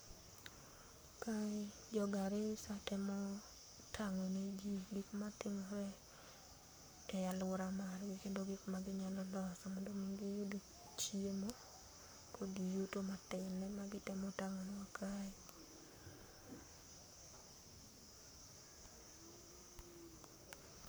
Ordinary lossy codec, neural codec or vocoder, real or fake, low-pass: none; codec, 44.1 kHz, 7.8 kbps, Pupu-Codec; fake; none